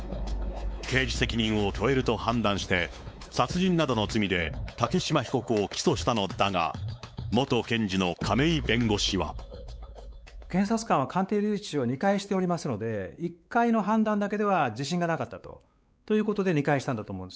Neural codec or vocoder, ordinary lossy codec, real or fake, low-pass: codec, 16 kHz, 4 kbps, X-Codec, WavLM features, trained on Multilingual LibriSpeech; none; fake; none